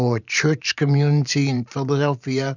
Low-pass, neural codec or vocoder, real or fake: 7.2 kHz; vocoder, 44.1 kHz, 128 mel bands every 512 samples, BigVGAN v2; fake